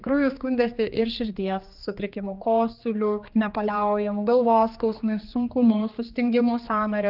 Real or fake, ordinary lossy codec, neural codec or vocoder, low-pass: fake; Opus, 24 kbps; codec, 16 kHz, 2 kbps, X-Codec, HuBERT features, trained on general audio; 5.4 kHz